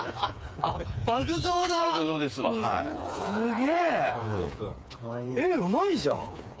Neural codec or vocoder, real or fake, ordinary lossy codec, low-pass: codec, 16 kHz, 4 kbps, FreqCodec, smaller model; fake; none; none